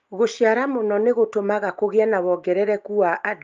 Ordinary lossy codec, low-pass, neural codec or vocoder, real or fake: Opus, 24 kbps; 7.2 kHz; none; real